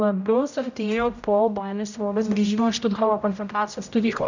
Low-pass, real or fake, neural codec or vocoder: 7.2 kHz; fake; codec, 16 kHz, 0.5 kbps, X-Codec, HuBERT features, trained on general audio